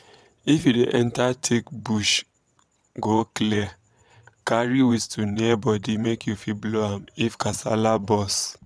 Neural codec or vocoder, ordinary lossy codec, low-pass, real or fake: vocoder, 22.05 kHz, 80 mel bands, WaveNeXt; none; none; fake